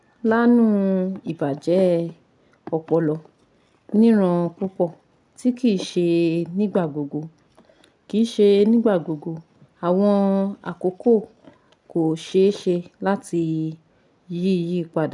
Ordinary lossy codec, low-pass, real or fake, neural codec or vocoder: none; 10.8 kHz; real; none